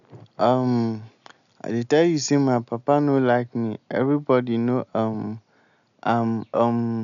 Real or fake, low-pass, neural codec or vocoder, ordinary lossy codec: real; 7.2 kHz; none; none